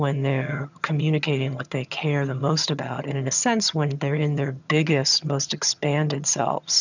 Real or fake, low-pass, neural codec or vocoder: fake; 7.2 kHz; vocoder, 22.05 kHz, 80 mel bands, HiFi-GAN